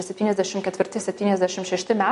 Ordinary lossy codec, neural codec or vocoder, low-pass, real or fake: MP3, 48 kbps; none; 14.4 kHz; real